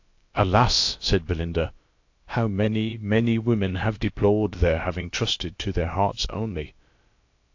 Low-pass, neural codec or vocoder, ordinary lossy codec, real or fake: 7.2 kHz; codec, 16 kHz, about 1 kbps, DyCAST, with the encoder's durations; AAC, 48 kbps; fake